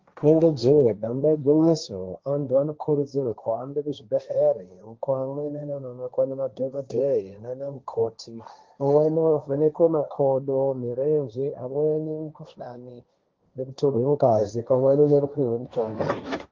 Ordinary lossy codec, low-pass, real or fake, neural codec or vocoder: Opus, 32 kbps; 7.2 kHz; fake; codec, 16 kHz, 1.1 kbps, Voila-Tokenizer